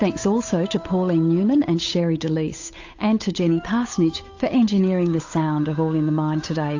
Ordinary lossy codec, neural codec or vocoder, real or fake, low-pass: AAC, 48 kbps; codec, 16 kHz, 8 kbps, FunCodec, trained on Chinese and English, 25 frames a second; fake; 7.2 kHz